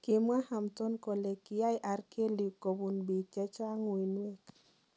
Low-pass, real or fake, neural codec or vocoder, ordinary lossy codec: none; real; none; none